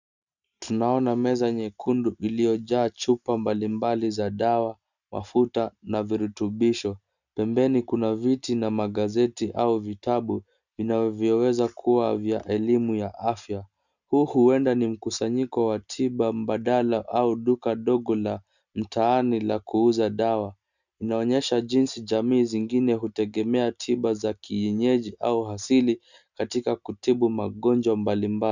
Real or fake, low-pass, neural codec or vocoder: real; 7.2 kHz; none